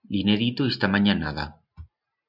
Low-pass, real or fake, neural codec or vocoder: 5.4 kHz; real; none